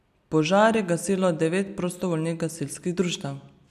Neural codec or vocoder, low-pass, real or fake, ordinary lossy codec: none; 14.4 kHz; real; none